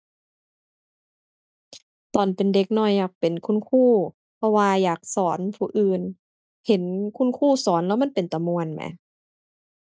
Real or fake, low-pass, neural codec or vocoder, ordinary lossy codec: real; none; none; none